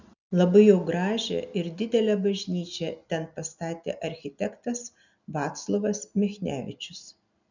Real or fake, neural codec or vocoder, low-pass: real; none; 7.2 kHz